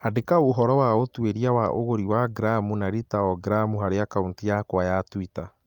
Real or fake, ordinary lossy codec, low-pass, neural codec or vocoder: real; Opus, 32 kbps; 19.8 kHz; none